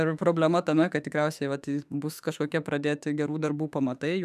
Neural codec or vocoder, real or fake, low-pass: autoencoder, 48 kHz, 32 numbers a frame, DAC-VAE, trained on Japanese speech; fake; 14.4 kHz